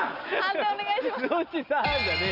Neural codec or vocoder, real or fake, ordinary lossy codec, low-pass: none; real; none; 5.4 kHz